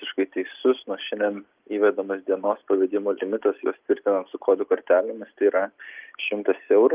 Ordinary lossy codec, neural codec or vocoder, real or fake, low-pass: Opus, 24 kbps; none; real; 3.6 kHz